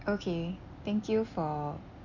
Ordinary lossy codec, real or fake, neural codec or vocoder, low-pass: none; real; none; 7.2 kHz